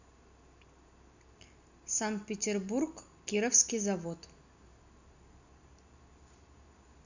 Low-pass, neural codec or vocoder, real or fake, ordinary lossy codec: 7.2 kHz; none; real; none